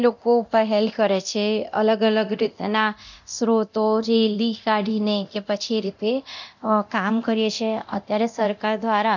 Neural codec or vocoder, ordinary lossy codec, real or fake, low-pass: codec, 24 kHz, 0.9 kbps, DualCodec; none; fake; 7.2 kHz